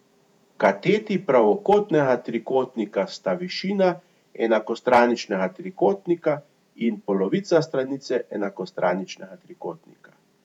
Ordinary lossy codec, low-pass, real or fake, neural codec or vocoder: none; 19.8 kHz; fake; vocoder, 48 kHz, 128 mel bands, Vocos